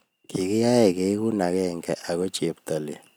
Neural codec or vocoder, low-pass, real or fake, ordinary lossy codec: none; none; real; none